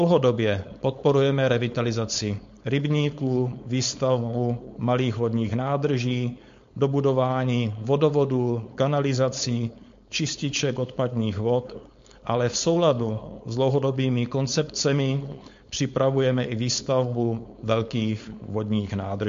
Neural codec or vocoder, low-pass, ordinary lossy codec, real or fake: codec, 16 kHz, 4.8 kbps, FACodec; 7.2 kHz; MP3, 48 kbps; fake